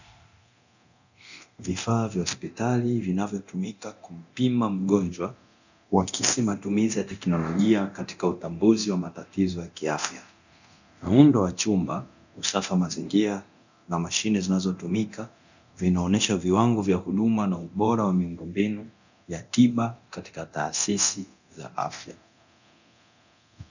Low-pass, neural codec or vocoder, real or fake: 7.2 kHz; codec, 24 kHz, 0.9 kbps, DualCodec; fake